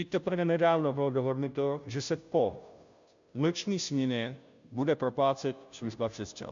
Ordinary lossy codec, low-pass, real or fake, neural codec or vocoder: MP3, 64 kbps; 7.2 kHz; fake; codec, 16 kHz, 0.5 kbps, FunCodec, trained on Chinese and English, 25 frames a second